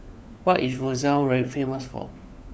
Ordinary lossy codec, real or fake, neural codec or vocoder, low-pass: none; fake; codec, 16 kHz, 4 kbps, FunCodec, trained on LibriTTS, 50 frames a second; none